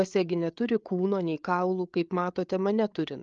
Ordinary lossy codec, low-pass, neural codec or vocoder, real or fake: Opus, 32 kbps; 7.2 kHz; codec, 16 kHz, 8 kbps, FreqCodec, larger model; fake